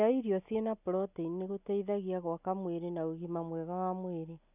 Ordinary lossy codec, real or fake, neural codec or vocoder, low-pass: none; real; none; 3.6 kHz